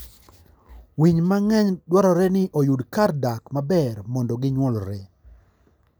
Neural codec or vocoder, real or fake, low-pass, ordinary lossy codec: none; real; none; none